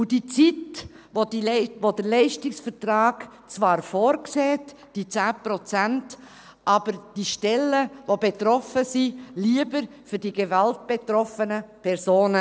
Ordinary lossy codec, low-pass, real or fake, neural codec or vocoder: none; none; real; none